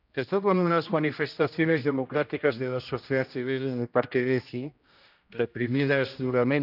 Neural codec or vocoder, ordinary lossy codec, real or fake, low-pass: codec, 16 kHz, 1 kbps, X-Codec, HuBERT features, trained on general audio; none; fake; 5.4 kHz